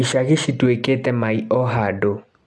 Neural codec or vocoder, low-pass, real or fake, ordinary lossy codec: none; none; real; none